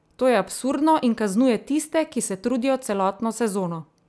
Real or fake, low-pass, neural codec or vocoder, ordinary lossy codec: real; none; none; none